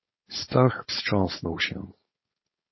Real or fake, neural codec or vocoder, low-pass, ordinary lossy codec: fake; codec, 16 kHz, 4.8 kbps, FACodec; 7.2 kHz; MP3, 24 kbps